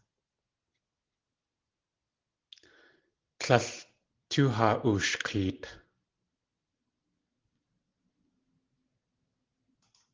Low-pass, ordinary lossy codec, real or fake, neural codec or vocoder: 7.2 kHz; Opus, 16 kbps; real; none